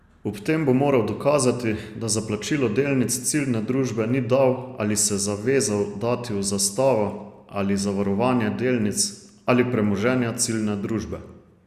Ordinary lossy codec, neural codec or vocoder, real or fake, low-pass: Opus, 64 kbps; none; real; 14.4 kHz